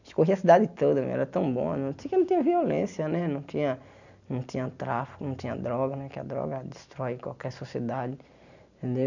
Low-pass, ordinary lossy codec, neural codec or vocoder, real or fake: 7.2 kHz; none; none; real